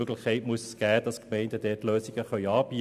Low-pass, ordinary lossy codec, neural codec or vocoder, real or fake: 14.4 kHz; none; none; real